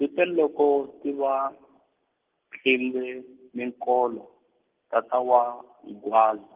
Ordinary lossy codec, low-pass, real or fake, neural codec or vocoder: Opus, 16 kbps; 3.6 kHz; real; none